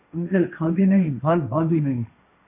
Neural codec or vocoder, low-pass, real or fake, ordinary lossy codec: codec, 16 kHz, 1.1 kbps, Voila-Tokenizer; 3.6 kHz; fake; MP3, 24 kbps